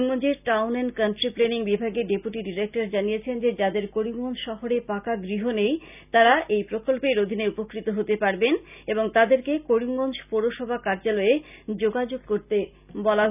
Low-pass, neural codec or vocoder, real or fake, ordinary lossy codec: 3.6 kHz; none; real; none